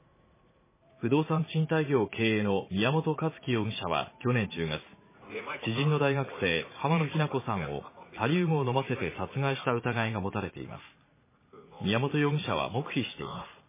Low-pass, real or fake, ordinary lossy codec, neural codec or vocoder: 3.6 kHz; fake; MP3, 16 kbps; vocoder, 44.1 kHz, 80 mel bands, Vocos